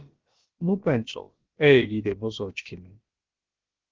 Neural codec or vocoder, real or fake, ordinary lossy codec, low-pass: codec, 16 kHz, about 1 kbps, DyCAST, with the encoder's durations; fake; Opus, 16 kbps; 7.2 kHz